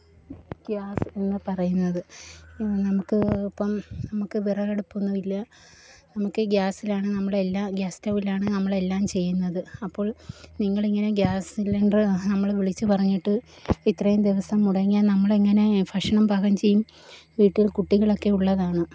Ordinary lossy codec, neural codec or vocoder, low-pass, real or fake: none; none; none; real